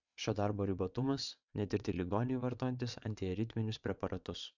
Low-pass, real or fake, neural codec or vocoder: 7.2 kHz; fake; vocoder, 22.05 kHz, 80 mel bands, WaveNeXt